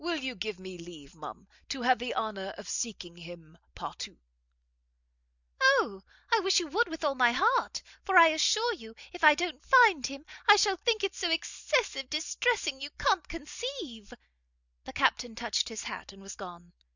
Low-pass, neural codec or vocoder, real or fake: 7.2 kHz; none; real